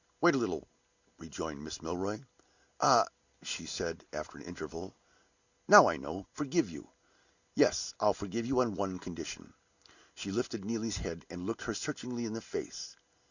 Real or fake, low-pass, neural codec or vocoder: real; 7.2 kHz; none